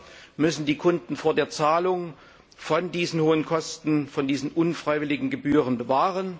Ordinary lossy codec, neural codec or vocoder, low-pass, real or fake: none; none; none; real